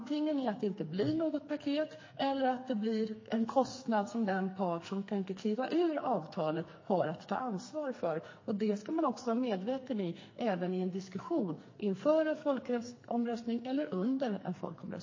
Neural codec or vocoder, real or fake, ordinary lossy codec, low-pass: codec, 44.1 kHz, 2.6 kbps, SNAC; fake; MP3, 32 kbps; 7.2 kHz